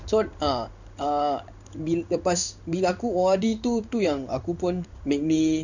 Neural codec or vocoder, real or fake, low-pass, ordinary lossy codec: codec, 16 kHz in and 24 kHz out, 1 kbps, XY-Tokenizer; fake; 7.2 kHz; none